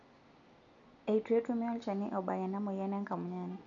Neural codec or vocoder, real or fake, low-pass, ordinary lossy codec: none; real; 7.2 kHz; none